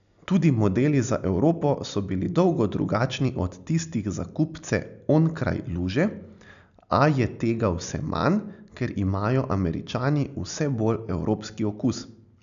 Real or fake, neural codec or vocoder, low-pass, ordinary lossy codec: real; none; 7.2 kHz; none